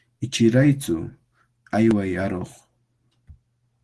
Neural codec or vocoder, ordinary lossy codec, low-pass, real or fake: none; Opus, 16 kbps; 10.8 kHz; real